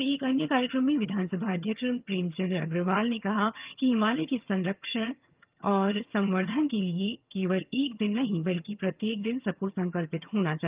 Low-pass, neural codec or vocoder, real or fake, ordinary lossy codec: 3.6 kHz; vocoder, 22.05 kHz, 80 mel bands, HiFi-GAN; fake; Opus, 24 kbps